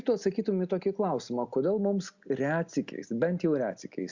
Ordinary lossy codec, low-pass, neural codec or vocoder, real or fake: Opus, 64 kbps; 7.2 kHz; none; real